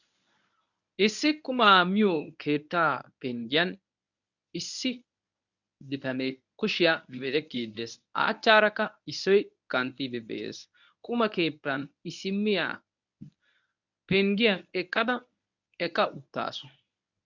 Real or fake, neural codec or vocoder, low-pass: fake; codec, 24 kHz, 0.9 kbps, WavTokenizer, medium speech release version 1; 7.2 kHz